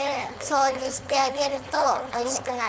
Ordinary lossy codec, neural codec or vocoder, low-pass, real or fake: none; codec, 16 kHz, 4.8 kbps, FACodec; none; fake